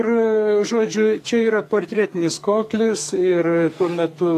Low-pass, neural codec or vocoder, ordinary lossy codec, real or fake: 14.4 kHz; codec, 44.1 kHz, 2.6 kbps, SNAC; AAC, 64 kbps; fake